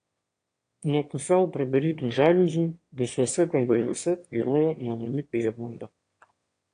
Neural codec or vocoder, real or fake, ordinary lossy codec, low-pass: autoencoder, 22.05 kHz, a latent of 192 numbers a frame, VITS, trained on one speaker; fake; AAC, 48 kbps; 9.9 kHz